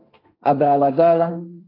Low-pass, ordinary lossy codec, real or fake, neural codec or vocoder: 5.4 kHz; AAC, 24 kbps; fake; codec, 16 kHz, 1.1 kbps, Voila-Tokenizer